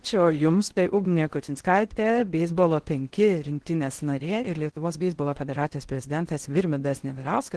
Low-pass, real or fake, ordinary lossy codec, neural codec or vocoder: 10.8 kHz; fake; Opus, 16 kbps; codec, 16 kHz in and 24 kHz out, 0.6 kbps, FocalCodec, streaming, 4096 codes